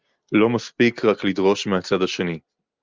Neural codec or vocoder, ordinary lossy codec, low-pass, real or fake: none; Opus, 24 kbps; 7.2 kHz; real